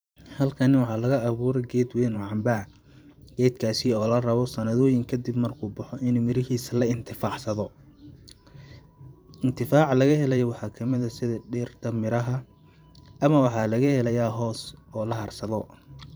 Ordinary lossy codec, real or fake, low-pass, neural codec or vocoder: none; fake; none; vocoder, 44.1 kHz, 128 mel bands every 512 samples, BigVGAN v2